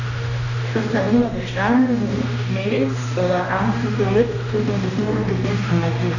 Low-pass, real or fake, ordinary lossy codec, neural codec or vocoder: 7.2 kHz; fake; none; codec, 16 kHz, 1 kbps, X-Codec, HuBERT features, trained on general audio